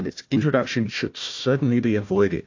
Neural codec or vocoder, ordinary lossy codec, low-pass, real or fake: codec, 16 kHz, 1 kbps, FunCodec, trained on Chinese and English, 50 frames a second; AAC, 48 kbps; 7.2 kHz; fake